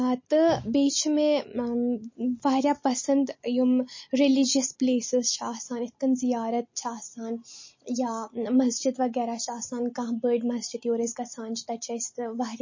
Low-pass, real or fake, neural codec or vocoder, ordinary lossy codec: 7.2 kHz; real; none; MP3, 32 kbps